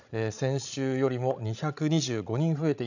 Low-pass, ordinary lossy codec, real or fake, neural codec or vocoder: 7.2 kHz; none; fake; codec, 16 kHz, 16 kbps, FreqCodec, larger model